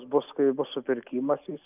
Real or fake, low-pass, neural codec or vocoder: real; 3.6 kHz; none